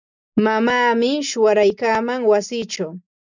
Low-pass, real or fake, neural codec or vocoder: 7.2 kHz; real; none